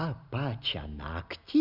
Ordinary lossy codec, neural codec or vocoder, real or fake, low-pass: Opus, 64 kbps; none; real; 5.4 kHz